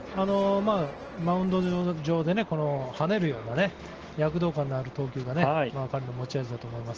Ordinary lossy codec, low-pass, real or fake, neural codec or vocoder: Opus, 16 kbps; 7.2 kHz; real; none